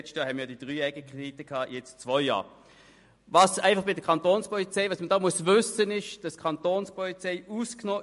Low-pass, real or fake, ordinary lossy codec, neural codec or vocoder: 14.4 kHz; real; MP3, 48 kbps; none